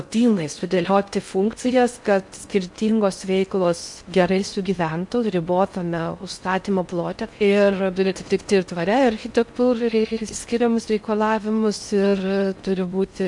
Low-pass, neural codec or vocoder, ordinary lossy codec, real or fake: 10.8 kHz; codec, 16 kHz in and 24 kHz out, 0.6 kbps, FocalCodec, streaming, 4096 codes; MP3, 64 kbps; fake